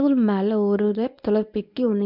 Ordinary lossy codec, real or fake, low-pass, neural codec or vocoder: none; fake; 5.4 kHz; codec, 24 kHz, 0.9 kbps, WavTokenizer, medium speech release version 1